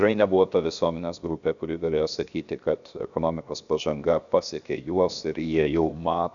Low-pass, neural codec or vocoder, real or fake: 7.2 kHz; codec, 16 kHz, 0.7 kbps, FocalCodec; fake